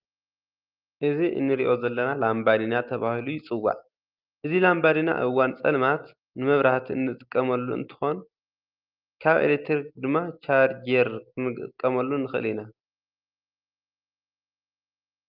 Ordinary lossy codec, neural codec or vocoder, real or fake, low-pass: Opus, 32 kbps; none; real; 5.4 kHz